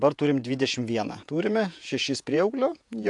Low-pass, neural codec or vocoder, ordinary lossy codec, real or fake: 10.8 kHz; vocoder, 48 kHz, 128 mel bands, Vocos; AAC, 64 kbps; fake